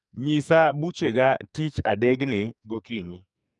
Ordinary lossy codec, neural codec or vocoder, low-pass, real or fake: none; codec, 44.1 kHz, 2.6 kbps, SNAC; 10.8 kHz; fake